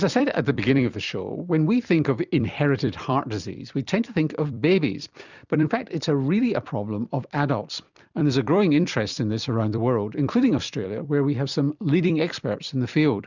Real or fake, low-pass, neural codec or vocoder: fake; 7.2 kHz; vocoder, 44.1 kHz, 128 mel bands every 256 samples, BigVGAN v2